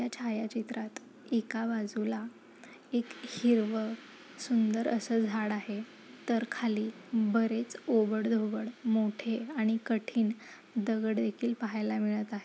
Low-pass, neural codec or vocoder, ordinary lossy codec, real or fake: none; none; none; real